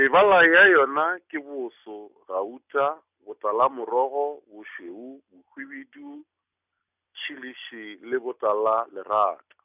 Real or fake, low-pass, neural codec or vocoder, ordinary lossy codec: real; 3.6 kHz; none; none